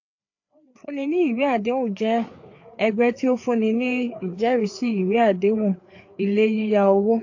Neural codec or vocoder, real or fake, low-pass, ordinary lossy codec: codec, 16 kHz, 4 kbps, FreqCodec, larger model; fake; 7.2 kHz; AAC, 48 kbps